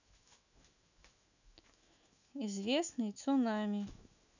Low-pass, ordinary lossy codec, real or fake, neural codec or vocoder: 7.2 kHz; none; fake; autoencoder, 48 kHz, 128 numbers a frame, DAC-VAE, trained on Japanese speech